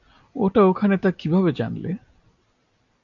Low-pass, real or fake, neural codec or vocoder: 7.2 kHz; real; none